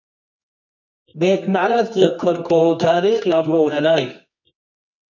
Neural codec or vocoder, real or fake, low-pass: codec, 24 kHz, 0.9 kbps, WavTokenizer, medium music audio release; fake; 7.2 kHz